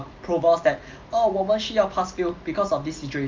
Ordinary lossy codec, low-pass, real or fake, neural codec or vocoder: Opus, 24 kbps; 7.2 kHz; real; none